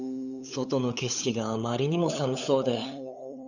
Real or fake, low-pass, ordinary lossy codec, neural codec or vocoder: fake; 7.2 kHz; none; codec, 16 kHz, 8 kbps, FunCodec, trained on LibriTTS, 25 frames a second